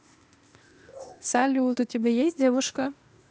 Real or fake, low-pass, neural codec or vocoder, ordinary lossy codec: fake; none; codec, 16 kHz, 0.8 kbps, ZipCodec; none